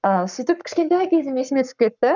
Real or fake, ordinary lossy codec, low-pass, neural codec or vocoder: fake; none; 7.2 kHz; codec, 44.1 kHz, 7.8 kbps, Pupu-Codec